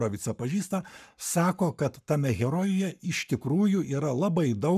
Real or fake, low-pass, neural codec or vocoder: fake; 14.4 kHz; codec, 44.1 kHz, 7.8 kbps, Pupu-Codec